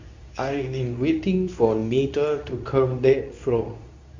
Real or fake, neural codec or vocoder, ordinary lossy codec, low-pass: fake; codec, 24 kHz, 0.9 kbps, WavTokenizer, medium speech release version 2; MP3, 64 kbps; 7.2 kHz